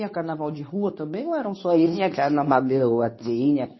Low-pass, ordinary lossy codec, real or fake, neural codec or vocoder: 7.2 kHz; MP3, 24 kbps; fake; codec, 24 kHz, 0.9 kbps, WavTokenizer, medium speech release version 2